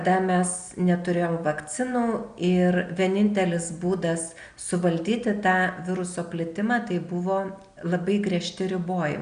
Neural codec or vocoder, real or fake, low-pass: none; real; 9.9 kHz